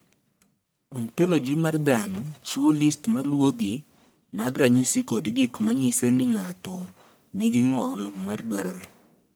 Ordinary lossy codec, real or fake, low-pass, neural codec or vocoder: none; fake; none; codec, 44.1 kHz, 1.7 kbps, Pupu-Codec